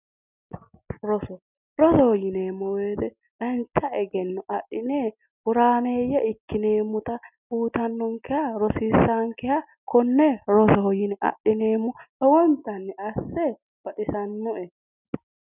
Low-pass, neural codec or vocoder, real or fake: 3.6 kHz; none; real